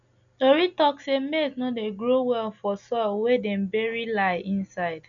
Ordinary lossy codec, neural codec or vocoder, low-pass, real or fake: none; none; 7.2 kHz; real